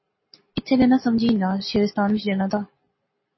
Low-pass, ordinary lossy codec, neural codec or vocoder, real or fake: 7.2 kHz; MP3, 24 kbps; none; real